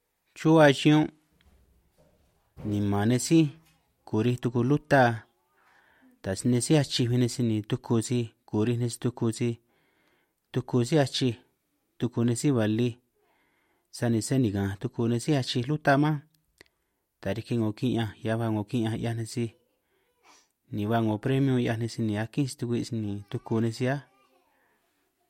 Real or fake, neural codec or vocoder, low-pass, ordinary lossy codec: real; none; 19.8 kHz; MP3, 64 kbps